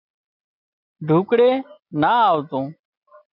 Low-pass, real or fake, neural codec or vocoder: 5.4 kHz; real; none